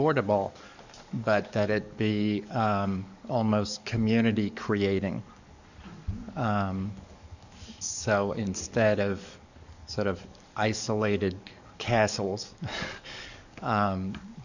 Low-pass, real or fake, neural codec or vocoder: 7.2 kHz; fake; codec, 44.1 kHz, 7.8 kbps, DAC